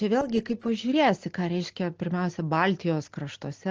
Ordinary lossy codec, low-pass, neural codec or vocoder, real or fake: Opus, 16 kbps; 7.2 kHz; none; real